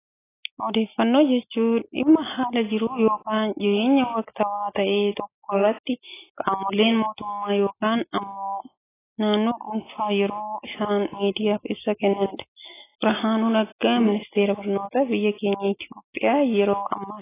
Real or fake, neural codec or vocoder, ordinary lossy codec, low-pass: real; none; AAC, 16 kbps; 3.6 kHz